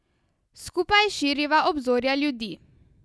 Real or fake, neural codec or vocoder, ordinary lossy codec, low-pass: real; none; none; none